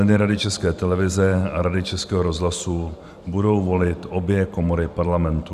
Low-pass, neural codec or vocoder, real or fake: 14.4 kHz; vocoder, 44.1 kHz, 128 mel bands every 512 samples, BigVGAN v2; fake